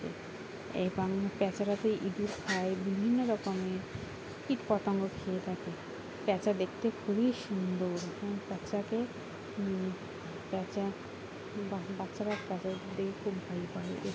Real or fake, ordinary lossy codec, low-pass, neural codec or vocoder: real; none; none; none